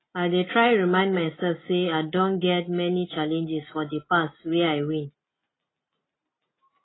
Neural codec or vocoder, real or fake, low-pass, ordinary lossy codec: none; real; 7.2 kHz; AAC, 16 kbps